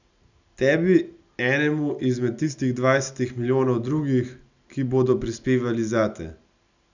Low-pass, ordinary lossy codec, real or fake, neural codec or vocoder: 7.2 kHz; none; real; none